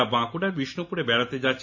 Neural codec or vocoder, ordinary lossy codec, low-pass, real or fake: none; none; 7.2 kHz; real